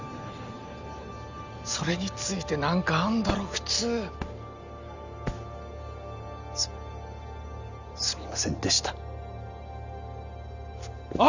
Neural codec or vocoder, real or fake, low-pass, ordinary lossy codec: none; real; 7.2 kHz; Opus, 64 kbps